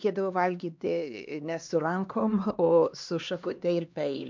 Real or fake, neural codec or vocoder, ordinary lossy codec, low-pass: fake; codec, 16 kHz, 2 kbps, X-Codec, HuBERT features, trained on LibriSpeech; MP3, 64 kbps; 7.2 kHz